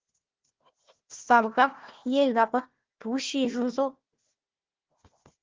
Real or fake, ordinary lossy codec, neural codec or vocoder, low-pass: fake; Opus, 16 kbps; codec, 16 kHz, 1 kbps, FunCodec, trained on Chinese and English, 50 frames a second; 7.2 kHz